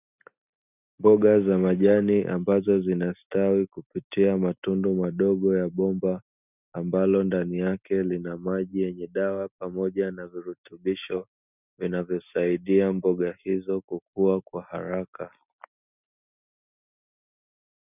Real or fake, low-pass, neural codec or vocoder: real; 3.6 kHz; none